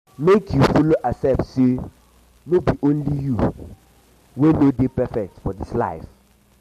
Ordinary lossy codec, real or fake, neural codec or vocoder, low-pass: MP3, 64 kbps; real; none; 14.4 kHz